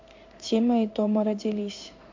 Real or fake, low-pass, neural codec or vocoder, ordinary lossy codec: fake; 7.2 kHz; codec, 16 kHz in and 24 kHz out, 1 kbps, XY-Tokenizer; MP3, 48 kbps